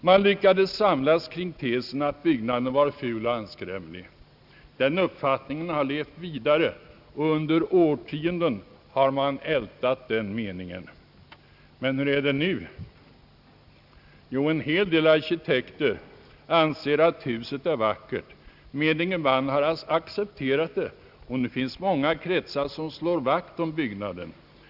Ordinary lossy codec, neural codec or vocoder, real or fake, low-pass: Opus, 64 kbps; none; real; 5.4 kHz